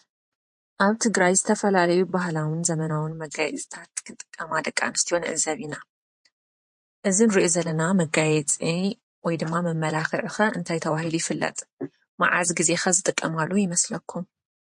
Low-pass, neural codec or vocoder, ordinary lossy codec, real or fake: 10.8 kHz; vocoder, 24 kHz, 100 mel bands, Vocos; MP3, 48 kbps; fake